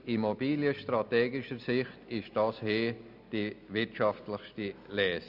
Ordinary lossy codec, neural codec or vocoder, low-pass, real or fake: none; none; 5.4 kHz; real